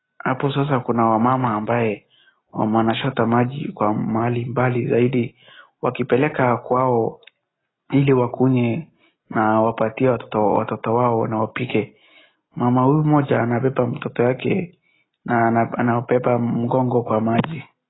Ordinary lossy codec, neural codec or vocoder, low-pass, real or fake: AAC, 16 kbps; none; 7.2 kHz; real